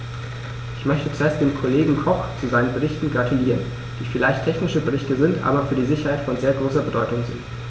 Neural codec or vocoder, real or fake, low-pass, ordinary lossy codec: none; real; none; none